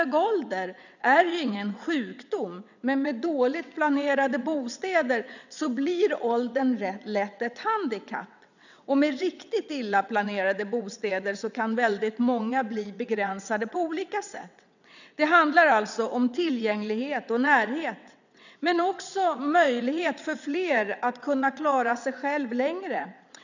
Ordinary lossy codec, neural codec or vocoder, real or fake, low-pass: none; vocoder, 22.05 kHz, 80 mel bands, WaveNeXt; fake; 7.2 kHz